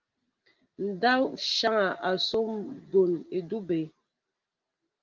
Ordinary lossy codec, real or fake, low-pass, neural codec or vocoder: Opus, 32 kbps; real; 7.2 kHz; none